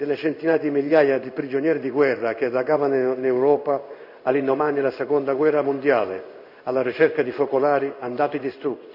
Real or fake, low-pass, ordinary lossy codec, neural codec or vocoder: fake; 5.4 kHz; none; codec, 16 kHz in and 24 kHz out, 1 kbps, XY-Tokenizer